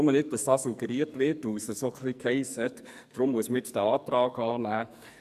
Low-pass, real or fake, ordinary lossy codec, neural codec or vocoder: 14.4 kHz; fake; none; codec, 44.1 kHz, 2.6 kbps, SNAC